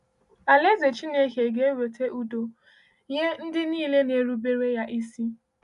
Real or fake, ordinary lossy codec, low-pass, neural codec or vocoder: real; none; 10.8 kHz; none